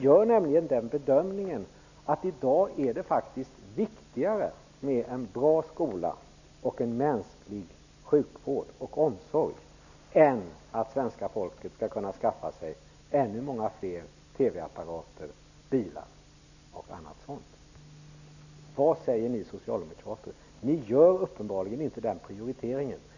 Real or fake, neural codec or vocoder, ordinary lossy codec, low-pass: real; none; none; 7.2 kHz